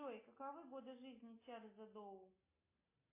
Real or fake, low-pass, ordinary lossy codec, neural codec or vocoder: real; 3.6 kHz; AAC, 24 kbps; none